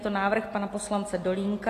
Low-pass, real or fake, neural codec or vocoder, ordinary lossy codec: 14.4 kHz; real; none; AAC, 48 kbps